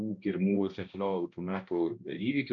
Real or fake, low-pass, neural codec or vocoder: fake; 7.2 kHz; codec, 16 kHz, 1 kbps, X-Codec, HuBERT features, trained on balanced general audio